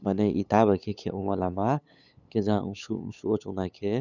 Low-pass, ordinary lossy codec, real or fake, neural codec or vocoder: 7.2 kHz; none; fake; codec, 16 kHz, 8 kbps, FunCodec, trained on LibriTTS, 25 frames a second